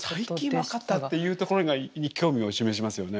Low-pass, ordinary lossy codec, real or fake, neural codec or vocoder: none; none; real; none